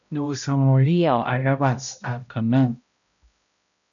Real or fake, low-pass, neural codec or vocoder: fake; 7.2 kHz; codec, 16 kHz, 0.5 kbps, X-Codec, HuBERT features, trained on balanced general audio